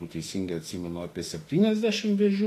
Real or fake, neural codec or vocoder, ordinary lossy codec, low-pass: fake; autoencoder, 48 kHz, 32 numbers a frame, DAC-VAE, trained on Japanese speech; AAC, 48 kbps; 14.4 kHz